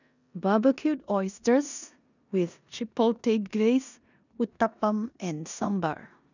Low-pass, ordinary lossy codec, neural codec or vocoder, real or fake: 7.2 kHz; none; codec, 16 kHz in and 24 kHz out, 0.9 kbps, LongCat-Audio-Codec, four codebook decoder; fake